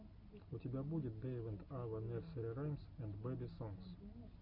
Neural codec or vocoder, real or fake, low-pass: none; real; 5.4 kHz